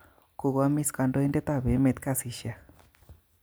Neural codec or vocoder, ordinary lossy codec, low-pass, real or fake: none; none; none; real